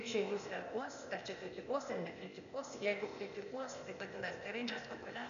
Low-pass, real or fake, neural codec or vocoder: 7.2 kHz; fake; codec, 16 kHz, 0.8 kbps, ZipCodec